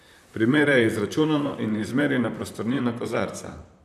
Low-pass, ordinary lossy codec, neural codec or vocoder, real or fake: 14.4 kHz; none; vocoder, 44.1 kHz, 128 mel bands, Pupu-Vocoder; fake